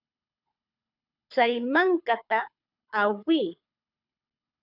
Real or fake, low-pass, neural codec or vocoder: fake; 5.4 kHz; codec, 24 kHz, 6 kbps, HILCodec